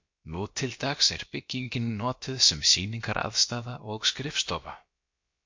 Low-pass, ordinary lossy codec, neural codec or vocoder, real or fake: 7.2 kHz; MP3, 48 kbps; codec, 16 kHz, about 1 kbps, DyCAST, with the encoder's durations; fake